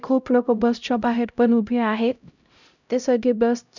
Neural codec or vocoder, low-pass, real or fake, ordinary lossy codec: codec, 16 kHz, 0.5 kbps, X-Codec, HuBERT features, trained on LibriSpeech; 7.2 kHz; fake; none